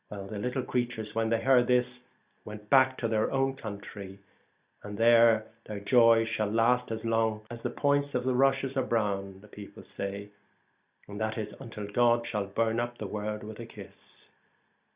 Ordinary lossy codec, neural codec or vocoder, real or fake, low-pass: Opus, 64 kbps; none; real; 3.6 kHz